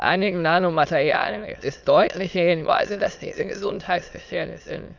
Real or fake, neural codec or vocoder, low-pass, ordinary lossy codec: fake; autoencoder, 22.05 kHz, a latent of 192 numbers a frame, VITS, trained on many speakers; 7.2 kHz; none